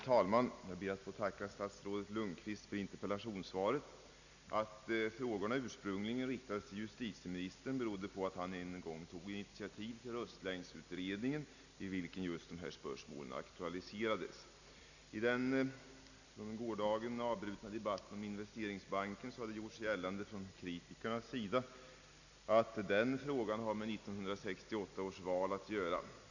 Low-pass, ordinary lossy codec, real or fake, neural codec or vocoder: 7.2 kHz; none; real; none